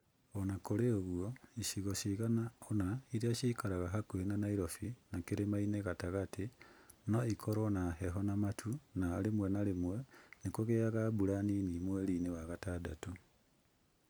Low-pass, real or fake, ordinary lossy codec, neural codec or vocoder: none; real; none; none